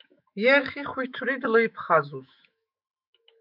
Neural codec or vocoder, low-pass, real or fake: autoencoder, 48 kHz, 128 numbers a frame, DAC-VAE, trained on Japanese speech; 5.4 kHz; fake